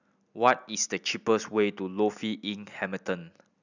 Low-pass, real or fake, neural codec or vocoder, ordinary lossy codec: 7.2 kHz; real; none; none